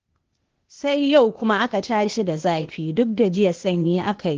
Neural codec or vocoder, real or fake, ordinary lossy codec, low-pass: codec, 16 kHz, 0.8 kbps, ZipCodec; fake; Opus, 16 kbps; 7.2 kHz